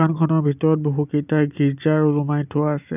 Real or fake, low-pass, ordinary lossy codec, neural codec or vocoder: real; 3.6 kHz; none; none